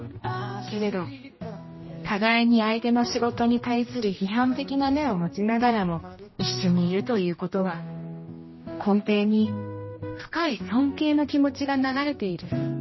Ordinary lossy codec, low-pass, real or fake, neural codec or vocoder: MP3, 24 kbps; 7.2 kHz; fake; codec, 16 kHz, 1 kbps, X-Codec, HuBERT features, trained on general audio